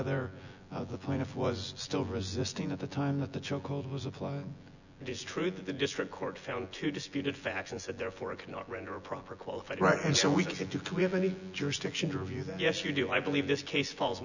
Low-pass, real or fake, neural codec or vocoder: 7.2 kHz; fake; vocoder, 24 kHz, 100 mel bands, Vocos